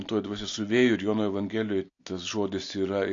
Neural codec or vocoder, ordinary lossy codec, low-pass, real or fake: none; AAC, 48 kbps; 7.2 kHz; real